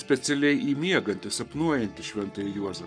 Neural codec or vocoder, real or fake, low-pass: codec, 44.1 kHz, 7.8 kbps, Pupu-Codec; fake; 9.9 kHz